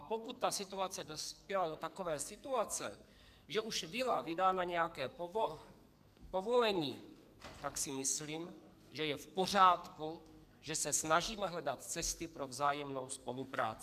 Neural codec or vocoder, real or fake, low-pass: codec, 44.1 kHz, 2.6 kbps, SNAC; fake; 14.4 kHz